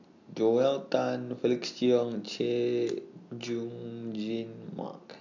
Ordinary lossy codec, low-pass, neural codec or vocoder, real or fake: none; 7.2 kHz; none; real